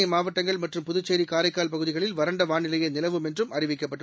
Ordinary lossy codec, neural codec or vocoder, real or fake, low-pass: none; none; real; none